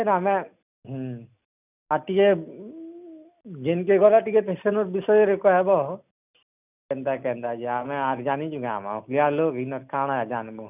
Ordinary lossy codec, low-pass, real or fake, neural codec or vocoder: none; 3.6 kHz; real; none